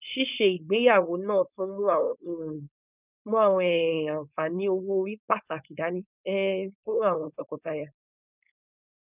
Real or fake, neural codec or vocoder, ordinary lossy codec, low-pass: fake; codec, 16 kHz, 4.8 kbps, FACodec; none; 3.6 kHz